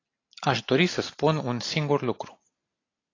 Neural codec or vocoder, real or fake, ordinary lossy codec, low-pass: none; real; AAC, 32 kbps; 7.2 kHz